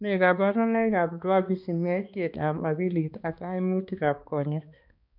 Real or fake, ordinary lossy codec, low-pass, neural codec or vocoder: fake; none; 5.4 kHz; codec, 16 kHz, 2 kbps, X-Codec, HuBERT features, trained on balanced general audio